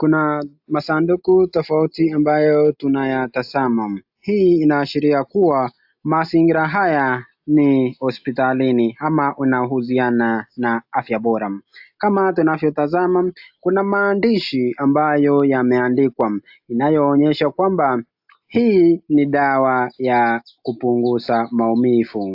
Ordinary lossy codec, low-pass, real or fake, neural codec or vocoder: AAC, 48 kbps; 5.4 kHz; real; none